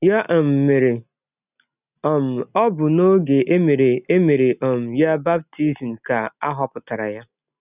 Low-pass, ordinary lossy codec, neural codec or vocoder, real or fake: 3.6 kHz; none; none; real